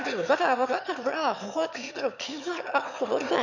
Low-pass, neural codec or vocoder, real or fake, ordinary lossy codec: 7.2 kHz; autoencoder, 22.05 kHz, a latent of 192 numbers a frame, VITS, trained on one speaker; fake; none